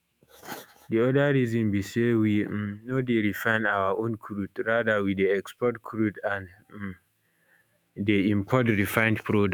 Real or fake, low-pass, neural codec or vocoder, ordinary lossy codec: fake; none; autoencoder, 48 kHz, 128 numbers a frame, DAC-VAE, trained on Japanese speech; none